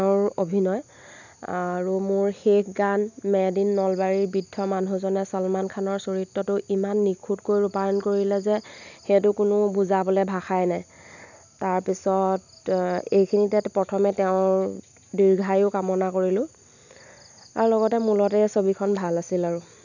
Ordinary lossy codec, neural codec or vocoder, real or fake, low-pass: none; none; real; 7.2 kHz